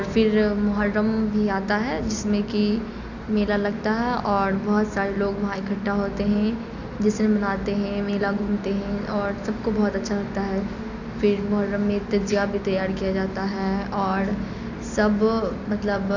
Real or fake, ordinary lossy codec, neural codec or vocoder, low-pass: real; none; none; 7.2 kHz